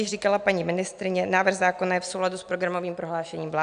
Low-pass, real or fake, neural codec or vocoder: 9.9 kHz; real; none